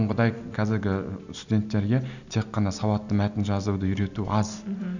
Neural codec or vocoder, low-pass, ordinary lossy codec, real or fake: none; 7.2 kHz; none; real